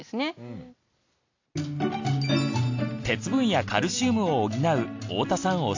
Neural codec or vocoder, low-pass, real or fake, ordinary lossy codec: none; 7.2 kHz; real; AAC, 48 kbps